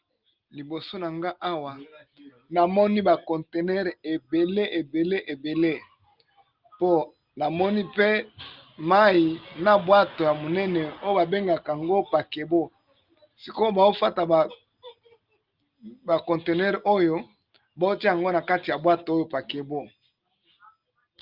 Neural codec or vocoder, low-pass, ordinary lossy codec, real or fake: none; 5.4 kHz; Opus, 32 kbps; real